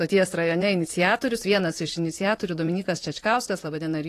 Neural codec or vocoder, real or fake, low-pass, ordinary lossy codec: vocoder, 44.1 kHz, 128 mel bands, Pupu-Vocoder; fake; 14.4 kHz; AAC, 48 kbps